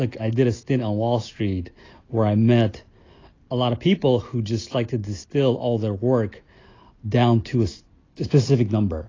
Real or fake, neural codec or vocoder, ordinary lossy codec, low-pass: real; none; AAC, 32 kbps; 7.2 kHz